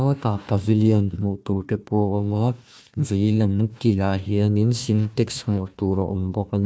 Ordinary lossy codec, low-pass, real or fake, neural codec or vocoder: none; none; fake; codec, 16 kHz, 1 kbps, FunCodec, trained on Chinese and English, 50 frames a second